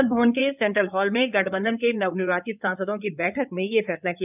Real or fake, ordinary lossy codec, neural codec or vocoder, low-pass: fake; none; codec, 16 kHz in and 24 kHz out, 2.2 kbps, FireRedTTS-2 codec; 3.6 kHz